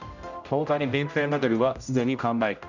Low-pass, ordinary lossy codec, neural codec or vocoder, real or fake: 7.2 kHz; none; codec, 16 kHz, 0.5 kbps, X-Codec, HuBERT features, trained on general audio; fake